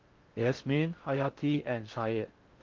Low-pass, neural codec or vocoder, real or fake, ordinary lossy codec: 7.2 kHz; codec, 16 kHz in and 24 kHz out, 0.6 kbps, FocalCodec, streaming, 4096 codes; fake; Opus, 32 kbps